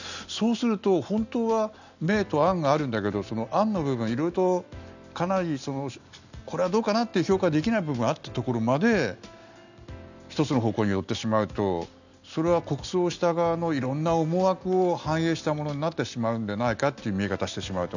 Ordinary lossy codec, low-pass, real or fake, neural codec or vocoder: none; 7.2 kHz; real; none